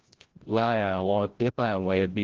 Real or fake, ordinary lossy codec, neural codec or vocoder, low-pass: fake; Opus, 16 kbps; codec, 16 kHz, 0.5 kbps, FreqCodec, larger model; 7.2 kHz